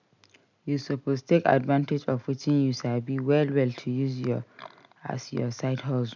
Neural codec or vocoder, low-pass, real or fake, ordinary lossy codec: none; 7.2 kHz; real; none